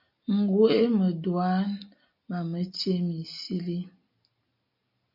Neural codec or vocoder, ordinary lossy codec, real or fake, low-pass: none; MP3, 48 kbps; real; 5.4 kHz